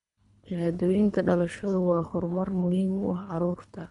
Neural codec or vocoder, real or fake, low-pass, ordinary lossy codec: codec, 24 kHz, 3 kbps, HILCodec; fake; 10.8 kHz; none